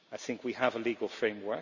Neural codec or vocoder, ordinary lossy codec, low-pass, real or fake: none; none; 7.2 kHz; real